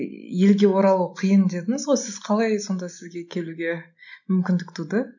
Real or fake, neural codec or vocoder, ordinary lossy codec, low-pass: real; none; none; 7.2 kHz